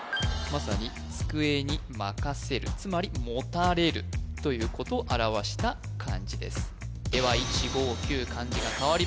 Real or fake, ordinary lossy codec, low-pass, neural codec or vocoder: real; none; none; none